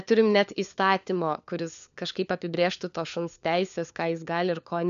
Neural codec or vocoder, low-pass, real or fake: codec, 16 kHz, 4 kbps, FunCodec, trained on LibriTTS, 50 frames a second; 7.2 kHz; fake